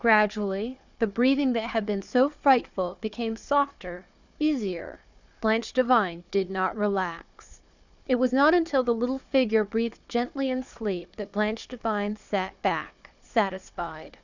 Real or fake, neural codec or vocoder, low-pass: fake; codec, 16 kHz, 4 kbps, FunCodec, trained on Chinese and English, 50 frames a second; 7.2 kHz